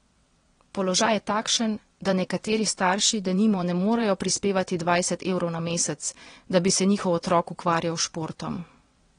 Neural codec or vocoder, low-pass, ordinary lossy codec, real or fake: vocoder, 22.05 kHz, 80 mel bands, WaveNeXt; 9.9 kHz; AAC, 32 kbps; fake